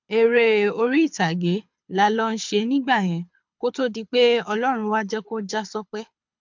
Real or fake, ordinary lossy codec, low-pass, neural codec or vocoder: fake; MP3, 64 kbps; 7.2 kHz; codec, 24 kHz, 6 kbps, HILCodec